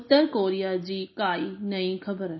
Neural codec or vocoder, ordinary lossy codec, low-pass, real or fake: none; MP3, 24 kbps; 7.2 kHz; real